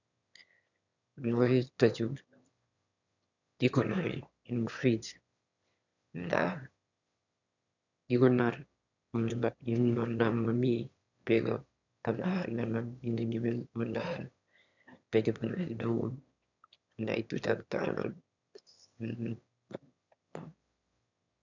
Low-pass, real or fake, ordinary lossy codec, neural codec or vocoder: 7.2 kHz; fake; none; autoencoder, 22.05 kHz, a latent of 192 numbers a frame, VITS, trained on one speaker